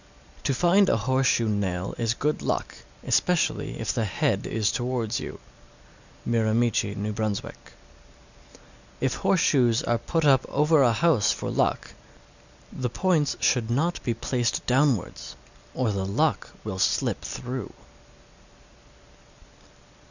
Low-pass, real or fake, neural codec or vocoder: 7.2 kHz; real; none